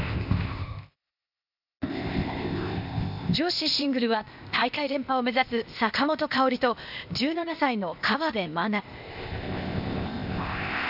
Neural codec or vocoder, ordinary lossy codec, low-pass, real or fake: codec, 16 kHz, 0.8 kbps, ZipCodec; none; 5.4 kHz; fake